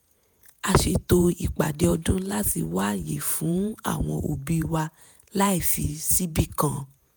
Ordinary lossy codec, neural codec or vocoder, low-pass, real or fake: none; vocoder, 48 kHz, 128 mel bands, Vocos; none; fake